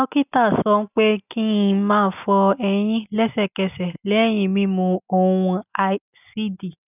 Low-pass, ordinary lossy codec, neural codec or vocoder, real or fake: 3.6 kHz; none; none; real